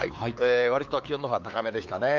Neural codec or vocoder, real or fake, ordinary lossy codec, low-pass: codec, 16 kHz, 4 kbps, X-Codec, WavLM features, trained on Multilingual LibriSpeech; fake; Opus, 24 kbps; 7.2 kHz